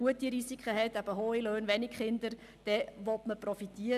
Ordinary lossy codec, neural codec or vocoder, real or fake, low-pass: none; none; real; 14.4 kHz